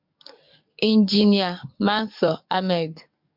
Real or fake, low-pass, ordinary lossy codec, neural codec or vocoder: fake; 5.4 kHz; MP3, 48 kbps; codec, 44.1 kHz, 7.8 kbps, DAC